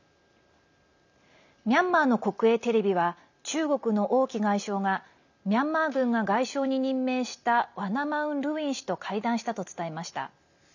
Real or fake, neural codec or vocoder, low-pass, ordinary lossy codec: real; none; 7.2 kHz; none